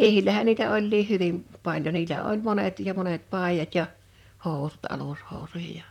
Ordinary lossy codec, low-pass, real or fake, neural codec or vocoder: none; 19.8 kHz; fake; vocoder, 44.1 kHz, 128 mel bands, Pupu-Vocoder